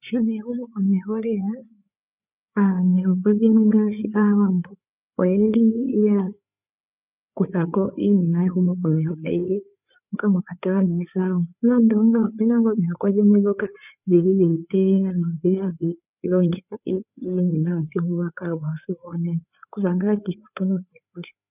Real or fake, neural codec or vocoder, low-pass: fake; codec, 16 kHz, 4 kbps, FreqCodec, larger model; 3.6 kHz